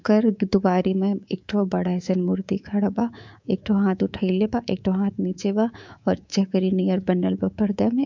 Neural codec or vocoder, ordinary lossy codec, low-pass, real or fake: codec, 24 kHz, 3.1 kbps, DualCodec; MP3, 64 kbps; 7.2 kHz; fake